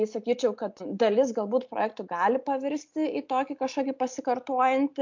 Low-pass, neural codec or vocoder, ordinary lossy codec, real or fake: 7.2 kHz; none; MP3, 64 kbps; real